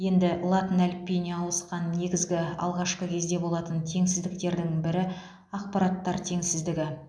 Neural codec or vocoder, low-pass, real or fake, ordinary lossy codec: none; none; real; none